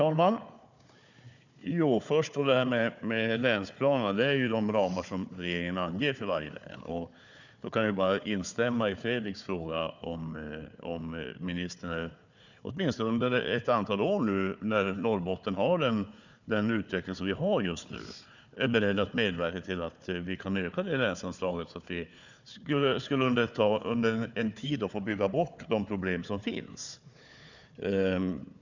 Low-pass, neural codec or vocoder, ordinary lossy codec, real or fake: 7.2 kHz; codec, 16 kHz, 4 kbps, FunCodec, trained on Chinese and English, 50 frames a second; none; fake